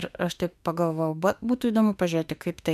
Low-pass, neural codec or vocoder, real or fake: 14.4 kHz; autoencoder, 48 kHz, 32 numbers a frame, DAC-VAE, trained on Japanese speech; fake